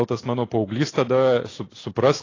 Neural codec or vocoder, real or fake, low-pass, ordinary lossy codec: none; real; 7.2 kHz; AAC, 32 kbps